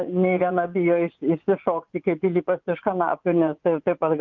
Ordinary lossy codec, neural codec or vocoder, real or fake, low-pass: Opus, 24 kbps; vocoder, 24 kHz, 100 mel bands, Vocos; fake; 7.2 kHz